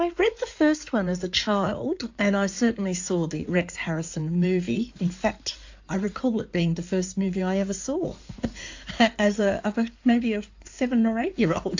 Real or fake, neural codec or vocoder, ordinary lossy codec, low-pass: fake; codec, 16 kHz in and 24 kHz out, 2.2 kbps, FireRedTTS-2 codec; AAC, 48 kbps; 7.2 kHz